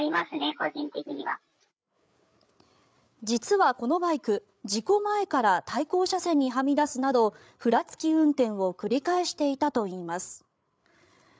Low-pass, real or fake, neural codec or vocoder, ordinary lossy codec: none; fake; codec, 16 kHz, 8 kbps, FreqCodec, larger model; none